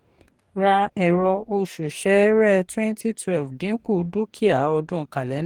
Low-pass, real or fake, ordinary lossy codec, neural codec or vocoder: 19.8 kHz; fake; Opus, 24 kbps; codec, 44.1 kHz, 2.6 kbps, DAC